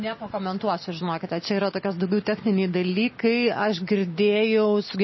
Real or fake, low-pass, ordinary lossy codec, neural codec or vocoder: real; 7.2 kHz; MP3, 24 kbps; none